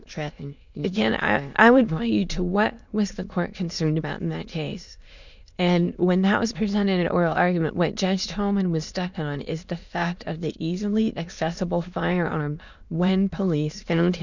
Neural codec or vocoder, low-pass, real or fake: autoencoder, 22.05 kHz, a latent of 192 numbers a frame, VITS, trained on many speakers; 7.2 kHz; fake